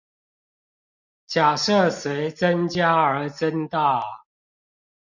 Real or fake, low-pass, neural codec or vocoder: real; 7.2 kHz; none